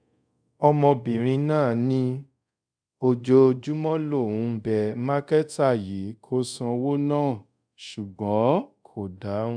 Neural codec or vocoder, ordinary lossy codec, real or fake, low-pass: codec, 24 kHz, 0.5 kbps, DualCodec; none; fake; 9.9 kHz